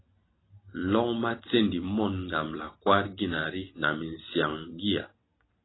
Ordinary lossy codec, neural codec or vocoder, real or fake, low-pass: AAC, 16 kbps; none; real; 7.2 kHz